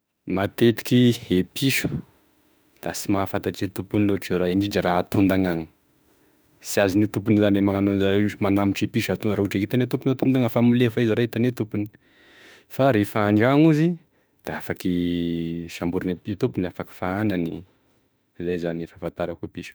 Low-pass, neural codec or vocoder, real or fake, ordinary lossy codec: none; autoencoder, 48 kHz, 32 numbers a frame, DAC-VAE, trained on Japanese speech; fake; none